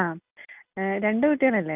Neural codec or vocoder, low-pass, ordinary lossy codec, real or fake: none; 3.6 kHz; Opus, 24 kbps; real